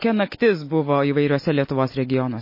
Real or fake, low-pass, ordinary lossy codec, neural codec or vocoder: real; 5.4 kHz; MP3, 24 kbps; none